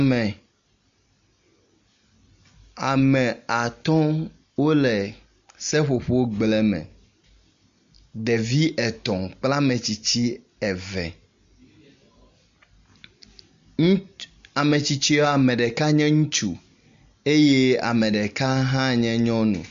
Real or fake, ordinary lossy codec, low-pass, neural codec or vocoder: real; MP3, 48 kbps; 7.2 kHz; none